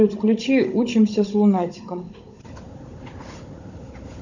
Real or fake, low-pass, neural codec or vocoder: fake; 7.2 kHz; codec, 16 kHz, 16 kbps, FunCodec, trained on Chinese and English, 50 frames a second